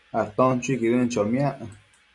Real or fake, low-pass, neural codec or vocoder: real; 10.8 kHz; none